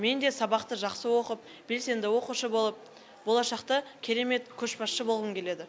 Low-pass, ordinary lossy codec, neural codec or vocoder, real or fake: none; none; none; real